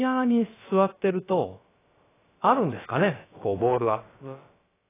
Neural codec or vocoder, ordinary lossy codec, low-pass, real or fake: codec, 16 kHz, about 1 kbps, DyCAST, with the encoder's durations; AAC, 16 kbps; 3.6 kHz; fake